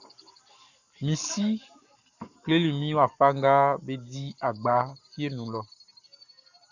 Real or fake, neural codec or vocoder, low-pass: fake; codec, 44.1 kHz, 7.8 kbps, Pupu-Codec; 7.2 kHz